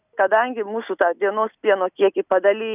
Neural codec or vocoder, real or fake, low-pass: none; real; 3.6 kHz